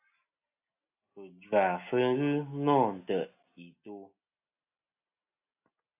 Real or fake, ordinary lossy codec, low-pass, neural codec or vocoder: real; AAC, 32 kbps; 3.6 kHz; none